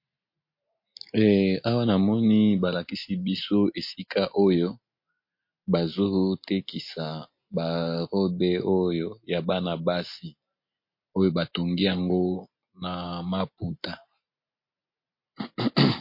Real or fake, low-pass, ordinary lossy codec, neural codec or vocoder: real; 5.4 kHz; MP3, 32 kbps; none